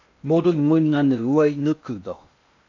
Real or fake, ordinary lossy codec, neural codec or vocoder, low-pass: fake; AAC, 48 kbps; codec, 16 kHz in and 24 kHz out, 0.6 kbps, FocalCodec, streaming, 2048 codes; 7.2 kHz